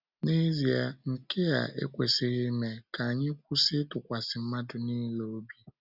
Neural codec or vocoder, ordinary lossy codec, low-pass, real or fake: none; none; 5.4 kHz; real